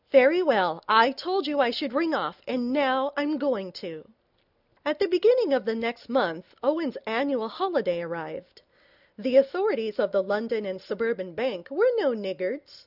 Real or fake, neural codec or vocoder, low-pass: real; none; 5.4 kHz